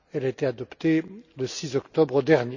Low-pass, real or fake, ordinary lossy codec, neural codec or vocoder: 7.2 kHz; real; none; none